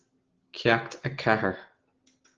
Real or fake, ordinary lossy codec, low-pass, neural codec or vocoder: real; Opus, 16 kbps; 7.2 kHz; none